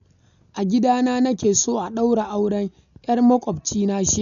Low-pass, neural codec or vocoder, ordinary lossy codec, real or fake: 7.2 kHz; none; none; real